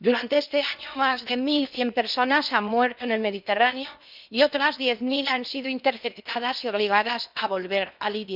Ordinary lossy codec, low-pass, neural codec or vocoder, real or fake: none; 5.4 kHz; codec, 16 kHz in and 24 kHz out, 0.8 kbps, FocalCodec, streaming, 65536 codes; fake